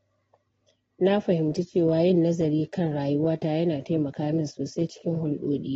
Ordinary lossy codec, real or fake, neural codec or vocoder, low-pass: AAC, 24 kbps; real; none; 9.9 kHz